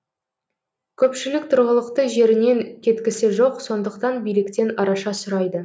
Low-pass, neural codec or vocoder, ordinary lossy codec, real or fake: none; none; none; real